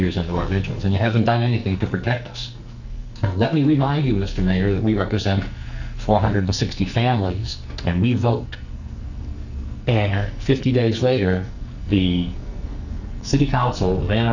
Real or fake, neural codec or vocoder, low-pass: fake; codec, 44.1 kHz, 2.6 kbps, SNAC; 7.2 kHz